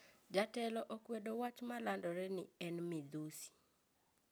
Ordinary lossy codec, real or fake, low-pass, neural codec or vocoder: none; real; none; none